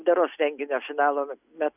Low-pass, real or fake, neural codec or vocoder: 3.6 kHz; real; none